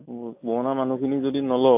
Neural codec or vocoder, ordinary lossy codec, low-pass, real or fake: none; AAC, 32 kbps; 3.6 kHz; real